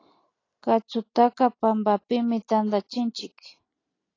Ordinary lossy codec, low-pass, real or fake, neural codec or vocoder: AAC, 32 kbps; 7.2 kHz; real; none